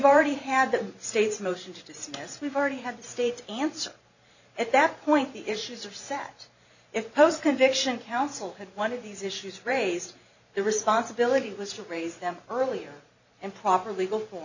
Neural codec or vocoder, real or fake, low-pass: vocoder, 44.1 kHz, 128 mel bands every 512 samples, BigVGAN v2; fake; 7.2 kHz